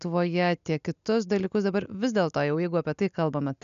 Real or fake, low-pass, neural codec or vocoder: real; 7.2 kHz; none